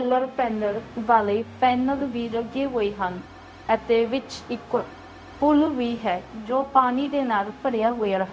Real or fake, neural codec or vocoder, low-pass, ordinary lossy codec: fake; codec, 16 kHz, 0.4 kbps, LongCat-Audio-Codec; none; none